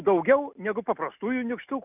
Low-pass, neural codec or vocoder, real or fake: 3.6 kHz; none; real